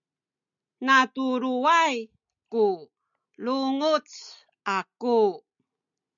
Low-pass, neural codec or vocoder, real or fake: 7.2 kHz; none; real